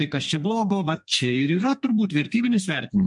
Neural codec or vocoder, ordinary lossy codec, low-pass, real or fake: codec, 32 kHz, 1.9 kbps, SNAC; MP3, 64 kbps; 10.8 kHz; fake